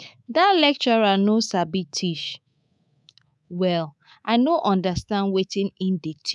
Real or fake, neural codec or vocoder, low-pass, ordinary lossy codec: fake; codec, 24 kHz, 3.1 kbps, DualCodec; none; none